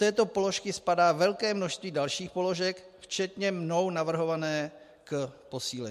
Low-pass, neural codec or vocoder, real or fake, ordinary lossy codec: 14.4 kHz; none; real; MP3, 64 kbps